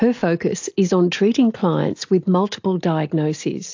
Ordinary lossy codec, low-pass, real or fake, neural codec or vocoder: AAC, 48 kbps; 7.2 kHz; real; none